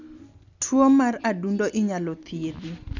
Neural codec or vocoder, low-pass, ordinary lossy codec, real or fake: none; 7.2 kHz; none; real